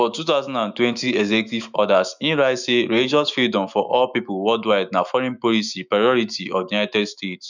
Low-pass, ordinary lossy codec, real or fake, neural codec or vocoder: 7.2 kHz; none; fake; autoencoder, 48 kHz, 128 numbers a frame, DAC-VAE, trained on Japanese speech